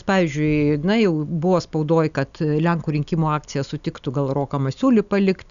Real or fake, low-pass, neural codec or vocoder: real; 7.2 kHz; none